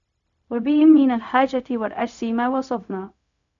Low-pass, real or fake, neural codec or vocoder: 7.2 kHz; fake; codec, 16 kHz, 0.4 kbps, LongCat-Audio-Codec